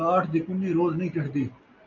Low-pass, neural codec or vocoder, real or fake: 7.2 kHz; none; real